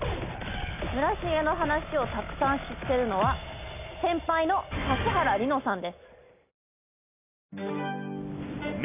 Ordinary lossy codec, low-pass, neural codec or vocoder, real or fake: none; 3.6 kHz; none; real